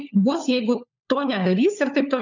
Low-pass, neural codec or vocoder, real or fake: 7.2 kHz; codec, 16 kHz, 4 kbps, FunCodec, trained on LibriTTS, 50 frames a second; fake